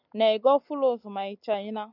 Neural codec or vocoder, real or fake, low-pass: none; real; 5.4 kHz